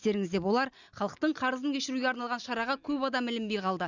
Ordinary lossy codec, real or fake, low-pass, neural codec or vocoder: none; real; 7.2 kHz; none